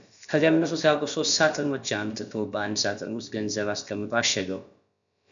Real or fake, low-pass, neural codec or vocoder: fake; 7.2 kHz; codec, 16 kHz, about 1 kbps, DyCAST, with the encoder's durations